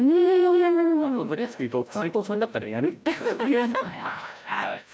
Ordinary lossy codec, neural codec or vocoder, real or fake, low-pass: none; codec, 16 kHz, 0.5 kbps, FreqCodec, larger model; fake; none